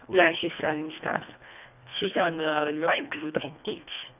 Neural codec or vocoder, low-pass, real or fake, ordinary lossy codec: codec, 24 kHz, 1.5 kbps, HILCodec; 3.6 kHz; fake; none